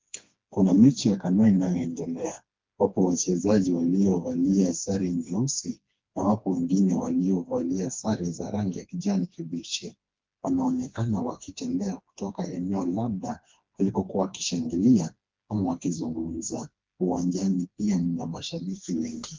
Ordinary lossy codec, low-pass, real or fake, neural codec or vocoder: Opus, 32 kbps; 7.2 kHz; fake; codec, 16 kHz, 2 kbps, FreqCodec, smaller model